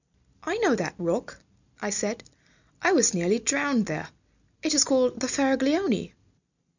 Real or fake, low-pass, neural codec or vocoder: real; 7.2 kHz; none